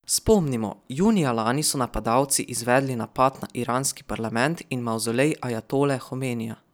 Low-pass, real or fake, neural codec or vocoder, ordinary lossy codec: none; real; none; none